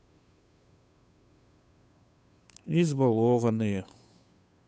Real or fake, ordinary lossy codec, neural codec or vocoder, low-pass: fake; none; codec, 16 kHz, 2 kbps, FunCodec, trained on Chinese and English, 25 frames a second; none